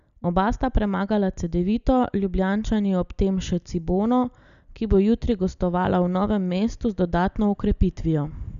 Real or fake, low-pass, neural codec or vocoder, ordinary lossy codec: real; 7.2 kHz; none; none